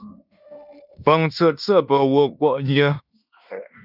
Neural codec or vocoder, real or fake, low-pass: codec, 16 kHz in and 24 kHz out, 0.9 kbps, LongCat-Audio-Codec, fine tuned four codebook decoder; fake; 5.4 kHz